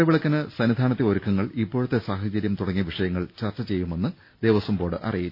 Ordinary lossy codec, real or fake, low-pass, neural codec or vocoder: none; real; 5.4 kHz; none